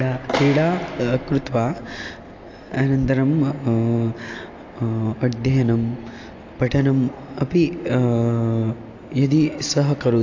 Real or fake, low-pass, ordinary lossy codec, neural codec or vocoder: real; 7.2 kHz; AAC, 48 kbps; none